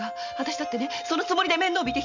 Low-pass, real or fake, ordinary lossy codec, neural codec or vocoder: 7.2 kHz; real; none; none